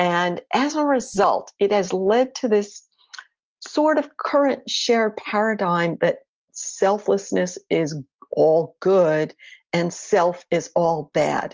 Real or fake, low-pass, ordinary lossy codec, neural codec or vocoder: real; 7.2 kHz; Opus, 24 kbps; none